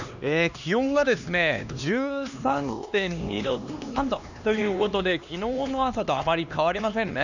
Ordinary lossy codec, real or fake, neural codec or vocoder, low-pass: none; fake; codec, 16 kHz, 2 kbps, X-Codec, HuBERT features, trained on LibriSpeech; 7.2 kHz